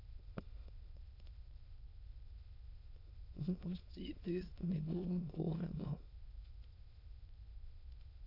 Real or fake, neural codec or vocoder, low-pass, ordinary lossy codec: fake; autoencoder, 22.05 kHz, a latent of 192 numbers a frame, VITS, trained on many speakers; 5.4 kHz; AAC, 48 kbps